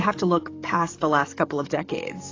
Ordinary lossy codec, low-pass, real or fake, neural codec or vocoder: AAC, 32 kbps; 7.2 kHz; fake; codec, 44.1 kHz, 7.8 kbps, DAC